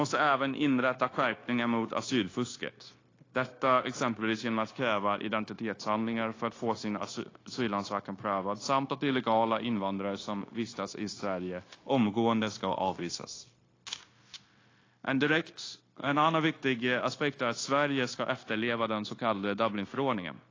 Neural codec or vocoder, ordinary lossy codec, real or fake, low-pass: codec, 16 kHz, 0.9 kbps, LongCat-Audio-Codec; AAC, 32 kbps; fake; 7.2 kHz